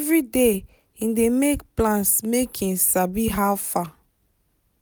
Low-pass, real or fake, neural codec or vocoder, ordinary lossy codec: none; real; none; none